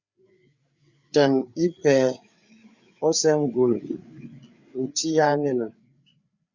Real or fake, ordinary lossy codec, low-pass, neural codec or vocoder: fake; Opus, 64 kbps; 7.2 kHz; codec, 16 kHz, 4 kbps, FreqCodec, larger model